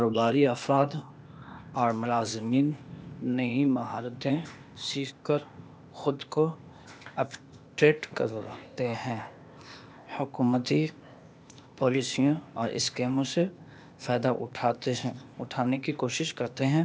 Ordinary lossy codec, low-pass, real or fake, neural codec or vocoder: none; none; fake; codec, 16 kHz, 0.8 kbps, ZipCodec